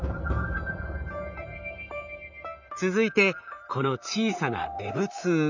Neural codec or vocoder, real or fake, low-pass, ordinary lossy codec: vocoder, 44.1 kHz, 128 mel bands, Pupu-Vocoder; fake; 7.2 kHz; none